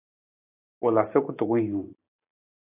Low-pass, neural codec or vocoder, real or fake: 3.6 kHz; none; real